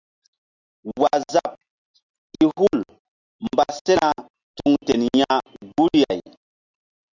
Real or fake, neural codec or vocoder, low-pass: real; none; 7.2 kHz